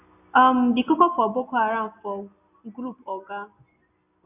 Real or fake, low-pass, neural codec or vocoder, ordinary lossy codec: real; 3.6 kHz; none; none